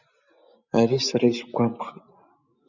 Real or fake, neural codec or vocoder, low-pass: real; none; 7.2 kHz